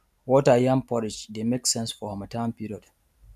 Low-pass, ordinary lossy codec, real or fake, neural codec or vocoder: 14.4 kHz; none; real; none